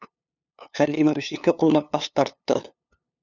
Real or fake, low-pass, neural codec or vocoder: fake; 7.2 kHz; codec, 16 kHz, 2 kbps, FunCodec, trained on LibriTTS, 25 frames a second